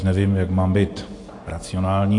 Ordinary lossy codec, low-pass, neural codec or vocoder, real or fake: AAC, 48 kbps; 10.8 kHz; none; real